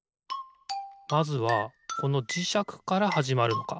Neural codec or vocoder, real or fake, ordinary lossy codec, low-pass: none; real; none; none